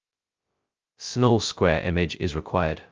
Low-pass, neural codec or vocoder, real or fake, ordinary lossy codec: 7.2 kHz; codec, 16 kHz, 0.2 kbps, FocalCodec; fake; Opus, 24 kbps